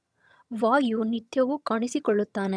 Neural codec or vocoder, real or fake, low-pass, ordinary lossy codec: vocoder, 22.05 kHz, 80 mel bands, HiFi-GAN; fake; none; none